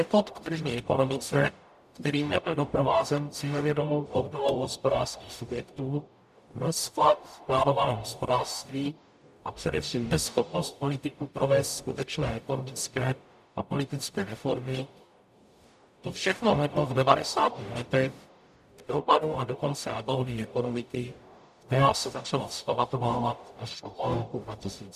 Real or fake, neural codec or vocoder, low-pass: fake; codec, 44.1 kHz, 0.9 kbps, DAC; 14.4 kHz